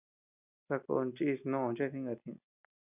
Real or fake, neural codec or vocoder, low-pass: real; none; 3.6 kHz